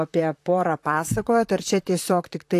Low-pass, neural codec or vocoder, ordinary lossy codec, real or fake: 14.4 kHz; vocoder, 44.1 kHz, 128 mel bands, Pupu-Vocoder; AAC, 64 kbps; fake